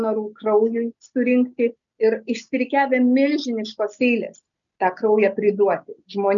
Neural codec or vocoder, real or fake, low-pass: none; real; 7.2 kHz